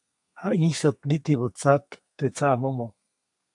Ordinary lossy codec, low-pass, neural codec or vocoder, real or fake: AAC, 64 kbps; 10.8 kHz; codec, 32 kHz, 1.9 kbps, SNAC; fake